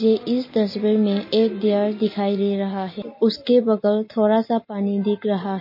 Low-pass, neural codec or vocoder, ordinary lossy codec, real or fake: 5.4 kHz; none; MP3, 24 kbps; real